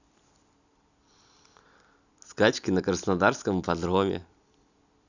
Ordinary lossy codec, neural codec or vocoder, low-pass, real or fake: none; none; 7.2 kHz; real